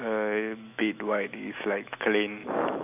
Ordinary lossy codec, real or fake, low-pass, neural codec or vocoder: none; fake; 3.6 kHz; autoencoder, 48 kHz, 128 numbers a frame, DAC-VAE, trained on Japanese speech